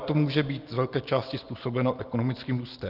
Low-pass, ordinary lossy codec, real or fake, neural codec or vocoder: 5.4 kHz; Opus, 32 kbps; real; none